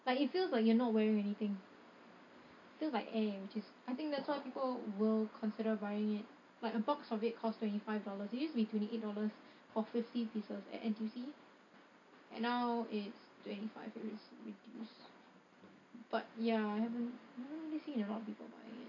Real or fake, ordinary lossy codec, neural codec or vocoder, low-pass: real; AAC, 48 kbps; none; 7.2 kHz